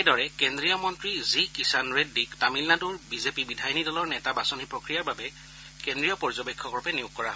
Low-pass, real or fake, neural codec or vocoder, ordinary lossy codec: none; real; none; none